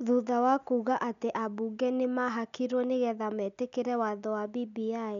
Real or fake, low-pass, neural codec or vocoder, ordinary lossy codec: real; 7.2 kHz; none; none